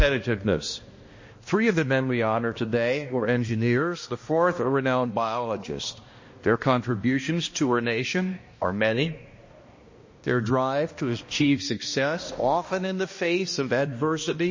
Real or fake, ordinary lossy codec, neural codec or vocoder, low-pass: fake; MP3, 32 kbps; codec, 16 kHz, 1 kbps, X-Codec, HuBERT features, trained on balanced general audio; 7.2 kHz